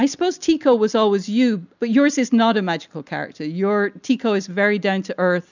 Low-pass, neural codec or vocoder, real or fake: 7.2 kHz; none; real